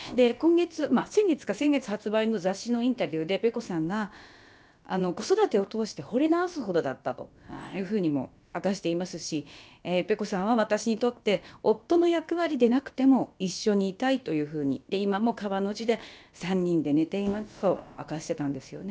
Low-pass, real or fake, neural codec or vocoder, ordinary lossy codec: none; fake; codec, 16 kHz, about 1 kbps, DyCAST, with the encoder's durations; none